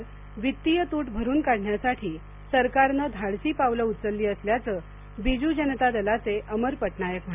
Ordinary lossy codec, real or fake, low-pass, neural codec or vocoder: none; real; 3.6 kHz; none